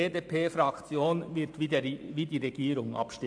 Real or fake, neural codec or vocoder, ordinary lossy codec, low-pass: fake; vocoder, 44.1 kHz, 128 mel bands every 512 samples, BigVGAN v2; AAC, 64 kbps; 9.9 kHz